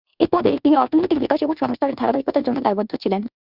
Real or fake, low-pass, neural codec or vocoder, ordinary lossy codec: fake; 5.4 kHz; codec, 24 kHz, 1.2 kbps, DualCodec; Opus, 64 kbps